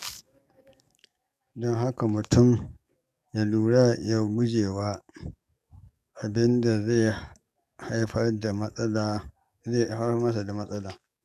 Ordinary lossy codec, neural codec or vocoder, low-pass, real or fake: none; codec, 44.1 kHz, 7.8 kbps, DAC; 14.4 kHz; fake